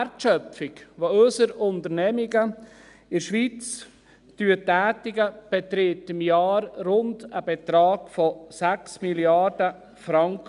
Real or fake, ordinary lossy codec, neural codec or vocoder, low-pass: real; none; none; 10.8 kHz